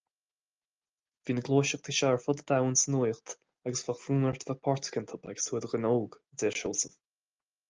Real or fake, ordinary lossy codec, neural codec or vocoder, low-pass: real; Opus, 24 kbps; none; 7.2 kHz